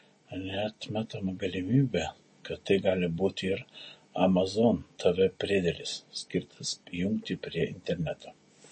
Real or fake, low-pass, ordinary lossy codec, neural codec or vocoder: real; 10.8 kHz; MP3, 32 kbps; none